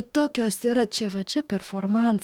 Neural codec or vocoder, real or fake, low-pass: codec, 44.1 kHz, 2.6 kbps, DAC; fake; 19.8 kHz